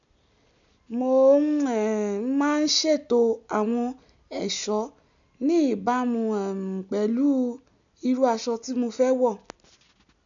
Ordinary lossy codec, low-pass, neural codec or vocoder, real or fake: none; 7.2 kHz; none; real